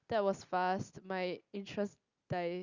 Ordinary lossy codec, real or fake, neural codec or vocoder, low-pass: Opus, 64 kbps; real; none; 7.2 kHz